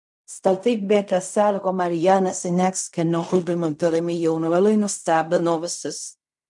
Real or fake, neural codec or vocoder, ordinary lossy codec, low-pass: fake; codec, 16 kHz in and 24 kHz out, 0.4 kbps, LongCat-Audio-Codec, fine tuned four codebook decoder; MP3, 96 kbps; 10.8 kHz